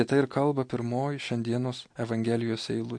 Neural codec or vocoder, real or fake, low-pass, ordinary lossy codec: none; real; 9.9 kHz; MP3, 48 kbps